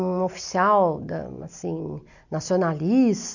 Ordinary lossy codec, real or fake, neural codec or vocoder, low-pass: none; real; none; 7.2 kHz